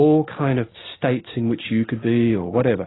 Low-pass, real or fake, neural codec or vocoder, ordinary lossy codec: 7.2 kHz; real; none; AAC, 16 kbps